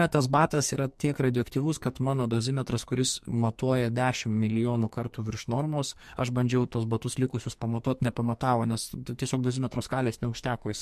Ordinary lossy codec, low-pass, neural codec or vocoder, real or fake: MP3, 64 kbps; 14.4 kHz; codec, 44.1 kHz, 2.6 kbps, SNAC; fake